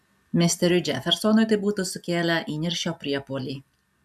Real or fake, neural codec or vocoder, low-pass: real; none; 14.4 kHz